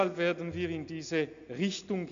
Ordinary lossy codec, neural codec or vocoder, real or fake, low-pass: MP3, 64 kbps; none; real; 7.2 kHz